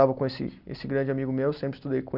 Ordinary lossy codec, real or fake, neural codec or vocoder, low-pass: none; real; none; 5.4 kHz